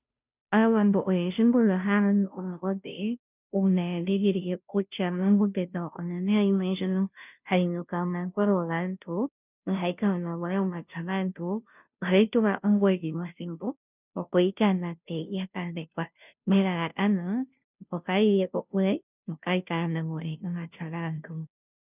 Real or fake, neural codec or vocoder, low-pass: fake; codec, 16 kHz, 0.5 kbps, FunCodec, trained on Chinese and English, 25 frames a second; 3.6 kHz